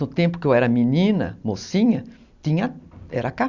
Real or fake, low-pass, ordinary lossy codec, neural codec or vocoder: real; 7.2 kHz; Opus, 64 kbps; none